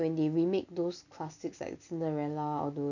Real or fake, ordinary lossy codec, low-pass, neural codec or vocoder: real; none; 7.2 kHz; none